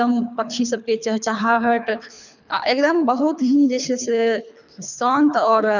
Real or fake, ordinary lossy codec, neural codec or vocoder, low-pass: fake; none; codec, 24 kHz, 3 kbps, HILCodec; 7.2 kHz